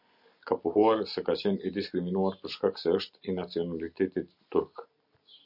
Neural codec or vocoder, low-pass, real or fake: none; 5.4 kHz; real